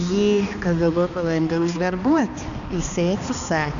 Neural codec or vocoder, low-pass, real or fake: codec, 16 kHz, 2 kbps, X-Codec, HuBERT features, trained on balanced general audio; 7.2 kHz; fake